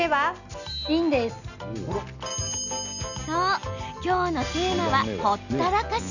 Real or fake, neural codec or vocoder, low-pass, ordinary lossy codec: real; none; 7.2 kHz; none